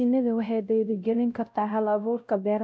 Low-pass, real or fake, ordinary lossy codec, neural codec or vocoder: none; fake; none; codec, 16 kHz, 0.5 kbps, X-Codec, WavLM features, trained on Multilingual LibriSpeech